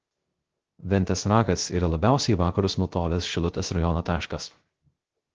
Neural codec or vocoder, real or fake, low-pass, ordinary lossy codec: codec, 16 kHz, 0.3 kbps, FocalCodec; fake; 7.2 kHz; Opus, 16 kbps